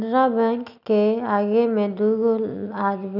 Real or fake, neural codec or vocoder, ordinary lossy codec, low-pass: real; none; none; 5.4 kHz